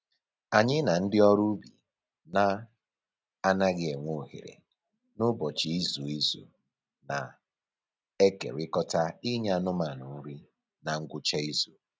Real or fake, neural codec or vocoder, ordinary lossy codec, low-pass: real; none; none; none